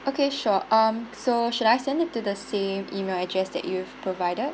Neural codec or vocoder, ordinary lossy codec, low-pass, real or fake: none; none; none; real